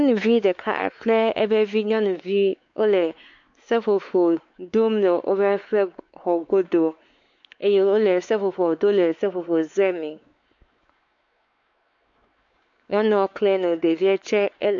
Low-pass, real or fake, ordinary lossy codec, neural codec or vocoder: 7.2 kHz; fake; AAC, 64 kbps; codec, 16 kHz, 4 kbps, X-Codec, WavLM features, trained on Multilingual LibriSpeech